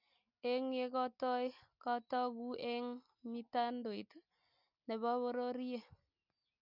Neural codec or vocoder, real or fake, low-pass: none; real; 5.4 kHz